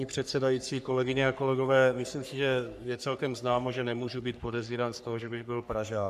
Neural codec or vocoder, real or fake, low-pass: codec, 44.1 kHz, 3.4 kbps, Pupu-Codec; fake; 14.4 kHz